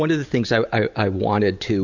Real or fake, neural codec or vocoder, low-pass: real; none; 7.2 kHz